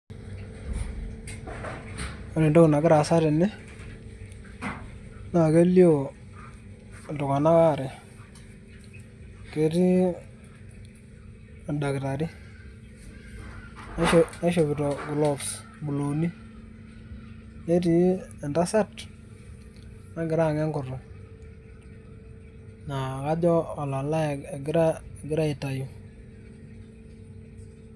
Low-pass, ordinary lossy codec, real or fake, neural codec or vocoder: none; none; real; none